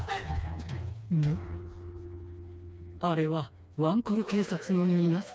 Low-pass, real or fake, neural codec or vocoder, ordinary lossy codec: none; fake; codec, 16 kHz, 2 kbps, FreqCodec, smaller model; none